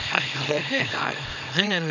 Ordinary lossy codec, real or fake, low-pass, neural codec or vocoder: none; fake; 7.2 kHz; codec, 24 kHz, 0.9 kbps, WavTokenizer, small release